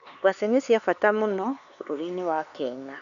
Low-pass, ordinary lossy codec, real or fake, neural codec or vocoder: 7.2 kHz; none; fake; codec, 16 kHz, 4 kbps, X-Codec, HuBERT features, trained on LibriSpeech